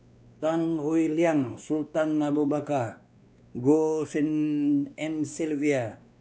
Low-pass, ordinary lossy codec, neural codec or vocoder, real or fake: none; none; codec, 16 kHz, 2 kbps, X-Codec, WavLM features, trained on Multilingual LibriSpeech; fake